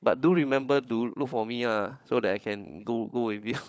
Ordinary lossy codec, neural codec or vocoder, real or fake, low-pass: none; codec, 16 kHz, 16 kbps, FunCodec, trained on LibriTTS, 50 frames a second; fake; none